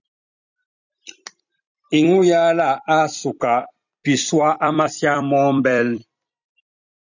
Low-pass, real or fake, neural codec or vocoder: 7.2 kHz; fake; vocoder, 44.1 kHz, 128 mel bands every 256 samples, BigVGAN v2